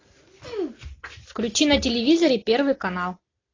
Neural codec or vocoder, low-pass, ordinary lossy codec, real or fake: none; 7.2 kHz; AAC, 32 kbps; real